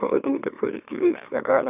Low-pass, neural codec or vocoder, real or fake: 3.6 kHz; autoencoder, 44.1 kHz, a latent of 192 numbers a frame, MeloTTS; fake